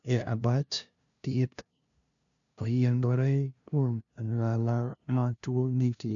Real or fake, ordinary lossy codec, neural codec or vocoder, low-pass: fake; none; codec, 16 kHz, 0.5 kbps, FunCodec, trained on LibriTTS, 25 frames a second; 7.2 kHz